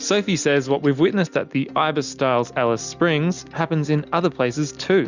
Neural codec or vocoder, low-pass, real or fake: none; 7.2 kHz; real